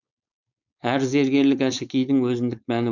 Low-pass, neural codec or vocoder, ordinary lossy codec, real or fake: 7.2 kHz; codec, 16 kHz, 4.8 kbps, FACodec; none; fake